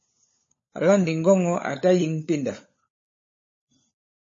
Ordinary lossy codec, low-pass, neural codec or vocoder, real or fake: MP3, 32 kbps; 7.2 kHz; codec, 16 kHz, 16 kbps, FunCodec, trained on LibriTTS, 50 frames a second; fake